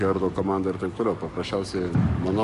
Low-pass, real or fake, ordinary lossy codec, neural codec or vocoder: 14.4 kHz; fake; MP3, 48 kbps; codec, 44.1 kHz, 7.8 kbps, DAC